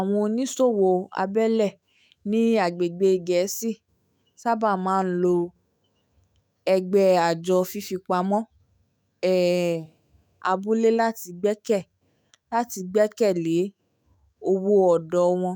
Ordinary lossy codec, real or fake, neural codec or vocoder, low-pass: none; fake; autoencoder, 48 kHz, 128 numbers a frame, DAC-VAE, trained on Japanese speech; none